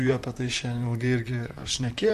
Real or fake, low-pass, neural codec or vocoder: fake; 14.4 kHz; vocoder, 44.1 kHz, 128 mel bands, Pupu-Vocoder